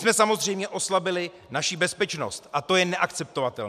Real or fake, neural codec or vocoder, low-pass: real; none; 14.4 kHz